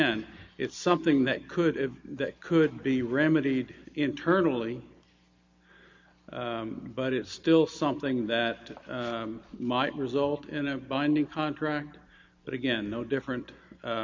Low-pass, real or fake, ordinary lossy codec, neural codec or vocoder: 7.2 kHz; real; MP3, 48 kbps; none